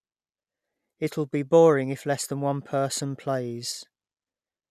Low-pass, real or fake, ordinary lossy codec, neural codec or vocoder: 14.4 kHz; real; none; none